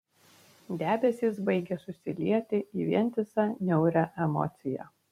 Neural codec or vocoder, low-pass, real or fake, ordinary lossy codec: vocoder, 44.1 kHz, 128 mel bands every 256 samples, BigVGAN v2; 19.8 kHz; fake; MP3, 64 kbps